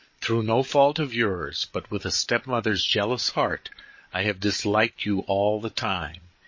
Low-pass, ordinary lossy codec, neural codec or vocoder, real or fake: 7.2 kHz; MP3, 32 kbps; codec, 16 kHz, 8 kbps, FreqCodec, larger model; fake